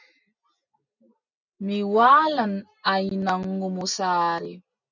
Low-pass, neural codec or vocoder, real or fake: 7.2 kHz; none; real